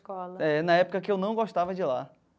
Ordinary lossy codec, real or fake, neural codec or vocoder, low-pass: none; real; none; none